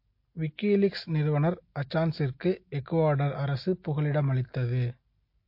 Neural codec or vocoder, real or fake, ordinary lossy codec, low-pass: none; real; MP3, 32 kbps; 5.4 kHz